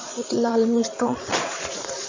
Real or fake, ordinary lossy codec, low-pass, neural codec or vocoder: fake; AAC, 48 kbps; 7.2 kHz; vocoder, 22.05 kHz, 80 mel bands, Vocos